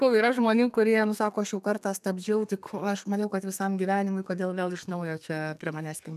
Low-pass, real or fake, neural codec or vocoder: 14.4 kHz; fake; codec, 32 kHz, 1.9 kbps, SNAC